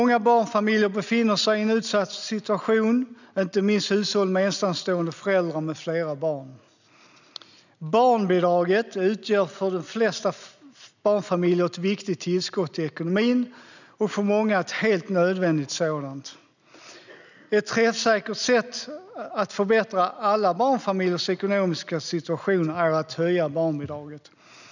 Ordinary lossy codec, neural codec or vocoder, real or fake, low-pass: none; none; real; 7.2 kHz